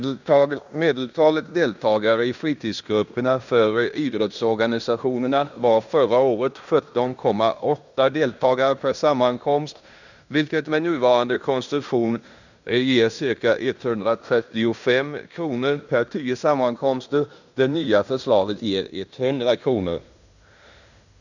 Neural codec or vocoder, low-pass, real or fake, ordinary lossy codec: codec, 16 kHz in and 24 kHz out, 0.9 kbps, LongCat-Audio-Codec, fine tuned four codebook decoder; 7.2 kHz; fake; none